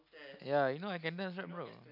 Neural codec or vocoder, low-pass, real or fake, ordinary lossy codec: none; 5.4 kHz; real; none